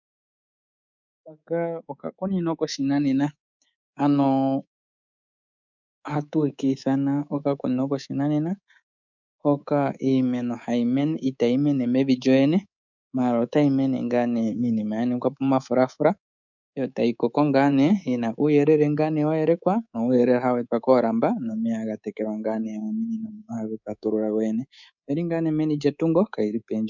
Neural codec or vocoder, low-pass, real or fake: codec, 24 kHz, 3.1 kbps, DualCodec; 7.2 kHz; fake